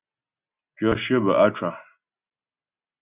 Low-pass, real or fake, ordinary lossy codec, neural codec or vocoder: 3.6 kHz; real; Opus, 64 kbps; none